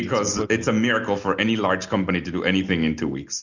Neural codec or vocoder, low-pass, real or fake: none; 7.2 kHz; real